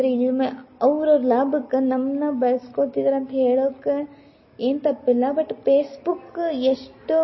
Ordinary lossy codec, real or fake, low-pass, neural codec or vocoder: MP3, 24 kbps; real; 7.2 kHz; none